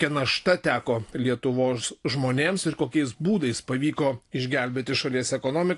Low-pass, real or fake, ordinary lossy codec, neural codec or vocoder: 10.8 kHz; real; AAC, 48 kbps; none